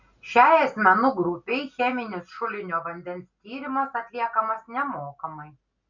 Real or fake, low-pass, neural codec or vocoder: real; 7.2 kHz; none